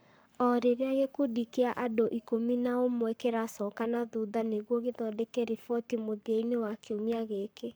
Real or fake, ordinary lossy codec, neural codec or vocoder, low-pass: fake; none; codec, 44.1 kHz, 7.8 kbps, Pupu-Codec; none